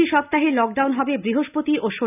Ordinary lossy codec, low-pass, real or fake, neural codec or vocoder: none; 3.6 kHz; real; none